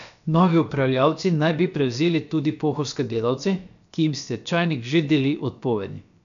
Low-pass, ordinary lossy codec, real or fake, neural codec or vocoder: 7.2 kHz; none; fake; codec, 16 kHz, about 1 kbps, DyCAST, with the encoder's durations